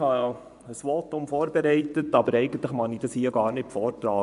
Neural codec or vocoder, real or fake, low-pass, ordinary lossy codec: vocoder, 24 kHz, 100 mel bands, Vocos; fake; 10.8 kHz; none